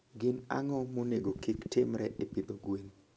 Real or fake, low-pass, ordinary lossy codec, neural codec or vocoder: real; none; none; none